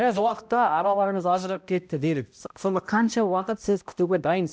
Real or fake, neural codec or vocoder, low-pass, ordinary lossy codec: fake; codec, 16 kHz, 0.5 kbps, X-Codec, HuBERT features, trained on balanced general audio; none; none